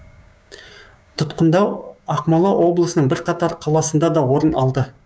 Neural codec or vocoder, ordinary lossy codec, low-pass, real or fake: codec, 16 kHz, 6 kbps, DAC; none; none; fake